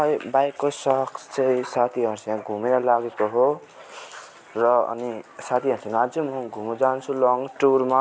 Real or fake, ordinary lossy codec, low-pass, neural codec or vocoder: real; none; none; none